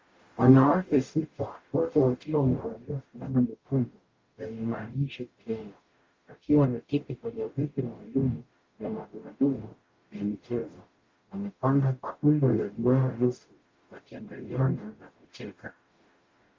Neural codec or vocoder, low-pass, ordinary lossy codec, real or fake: codec, 44.1 kHz, 0.9 kbps, DAC; 7.2 kHz; Opus, 32 kbps; fake